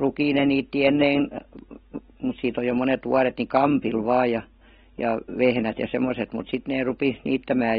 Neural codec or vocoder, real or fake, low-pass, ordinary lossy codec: none; real; 9.9 kHz; AAC, 16 kbps